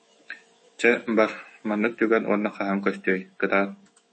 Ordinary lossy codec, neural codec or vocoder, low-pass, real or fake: MP3, 32 kbps; autoencoder, 48 kHz, 128 numbers a frame, DAC-VAE, trained on Japanese speech; 9.9 kHz; fake